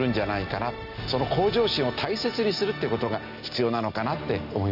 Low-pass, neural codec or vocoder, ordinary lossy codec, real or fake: 5.4 kHz; none; none; real